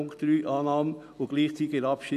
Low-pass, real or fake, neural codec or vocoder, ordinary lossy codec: 14.4 kHz; real; none; none